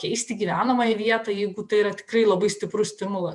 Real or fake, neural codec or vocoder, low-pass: fake; vocoder, 48 kHz, 128 mel bands, Vocos; 10.8 kHz